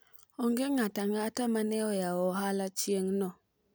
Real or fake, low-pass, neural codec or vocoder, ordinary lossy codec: real; none; none; none